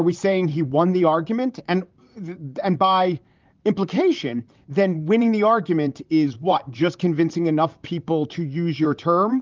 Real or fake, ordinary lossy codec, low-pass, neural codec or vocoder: fake; Opus, 24 kbps; 7.2 kHz; vocoder, 44.1 kHz, 128 mel bands, Pupu-Vocoder